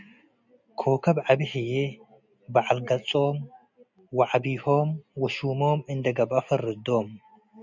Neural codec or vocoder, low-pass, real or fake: none; 7.2 kHz; real